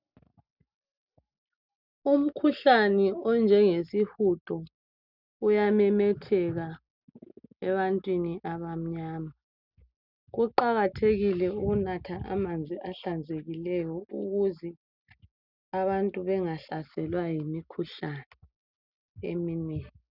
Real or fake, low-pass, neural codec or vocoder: real; 5.4 kHz; none